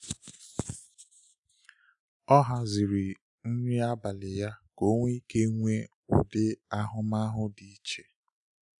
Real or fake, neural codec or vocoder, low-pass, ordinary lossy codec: real; none; 10.8 kHz; none